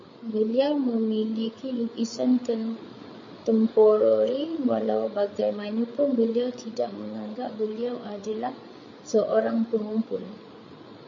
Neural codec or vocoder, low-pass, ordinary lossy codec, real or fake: codec, 16 kHz, 8 kbps, FreqCodec, larger model; 7.2 kHz; MP3, 32 kbps; fake